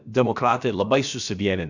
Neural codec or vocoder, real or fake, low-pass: codec, 16 kHz, about 1 kbps, DyCAST, with the encoder's durations; fake; 7.2 kHz